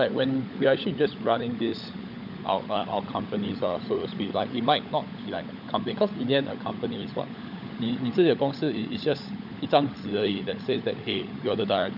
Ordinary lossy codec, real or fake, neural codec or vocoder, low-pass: none; fake; codec, 16 kHz, 16 kbps, FunCodec, trained on LibriTTS, 50 frames a second; 5.4 kHz